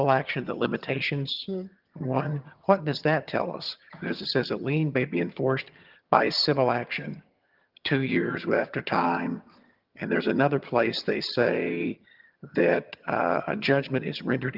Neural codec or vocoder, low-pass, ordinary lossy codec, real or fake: vocoder, 22.05 kHz, 80 mel bands, HiFi-GAN; 5.4 kHz; Opus, 32 kbps; fake